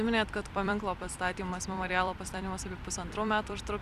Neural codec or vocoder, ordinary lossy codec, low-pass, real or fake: vocoder, 44.1 kHz, 128 mel bands every 256 samples, BigVGAN v2; Opus, 64 kbps; 14.4 kHz; fake